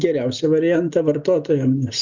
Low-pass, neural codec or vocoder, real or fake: 7.2 kHz; none; real